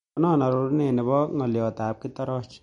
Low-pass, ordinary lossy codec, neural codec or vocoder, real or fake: 19.8 kHz; MP3, 48 kbps; none; real